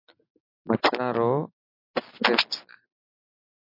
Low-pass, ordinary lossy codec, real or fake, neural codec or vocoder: 5.4 kHz; MP3, 32 kbps; real; none